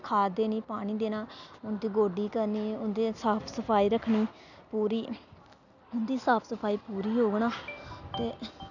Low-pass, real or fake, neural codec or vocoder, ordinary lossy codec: 7.2 kHz; real; none; none